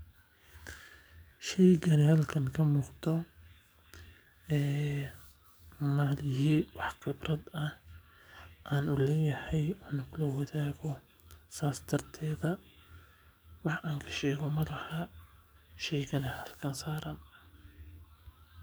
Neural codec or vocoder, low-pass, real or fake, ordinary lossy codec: codec, 44.1 kHz, 7.8 kbps, DAC; none; fake; none